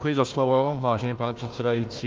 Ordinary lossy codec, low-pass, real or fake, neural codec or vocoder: Opus, 32 kbps; 7.2 kHz; fake; codec, 16 kHz, 1 kbps, FunCodec, trained on Chinese and English, 50 frames a second